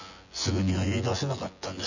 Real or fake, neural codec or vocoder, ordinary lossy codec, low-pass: fake; vocoder, 24 kHz, 100 mel bands, Vocos; none; 7.2 kHz